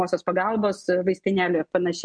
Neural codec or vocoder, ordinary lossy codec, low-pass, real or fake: none; MP3, 64 kbps; 9.9 kHz; real